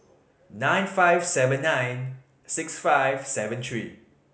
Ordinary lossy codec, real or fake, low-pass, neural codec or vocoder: none; real; none; none